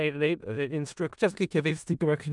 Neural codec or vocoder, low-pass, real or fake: codec, 16 kHz in and 24 kHz out, 0.4 kbps, LongCat-Audio-Codec, four codebook decoder; 10.8 kHz; fake